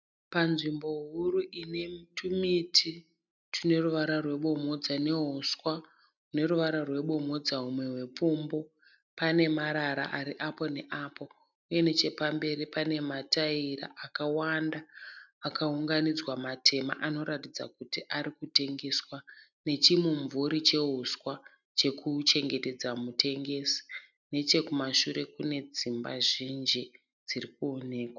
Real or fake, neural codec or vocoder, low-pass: real; none; 7.2 kHz